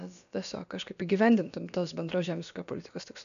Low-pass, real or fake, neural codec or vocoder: 7.2 kHz; fake; codec, 16 kHz, about 1 kbps, DyCAST, with the encoder's durations